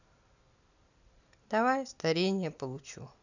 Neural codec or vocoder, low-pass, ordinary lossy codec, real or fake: none; 7.2 kHz; none; real